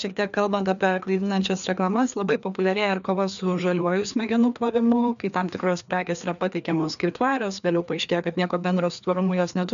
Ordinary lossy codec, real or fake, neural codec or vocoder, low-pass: MP3, 96 kbps; fake; codec, 16 kHz, 2 kbps, FreqCodec, larger model; 7.2 kHz